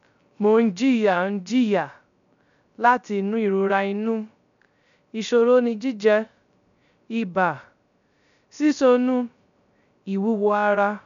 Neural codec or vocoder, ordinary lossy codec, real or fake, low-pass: codec, 16 kHz, 0.3 kbps, FocalCodec; none; fake; 7.2 kHz